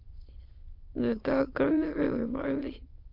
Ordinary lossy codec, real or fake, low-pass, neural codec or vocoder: Opus, 24 kbps; fake; 5.4 kHz; autoencoder, 22.05 kHz, a latent of 192 numbers a frame, VITS, trained on many speakers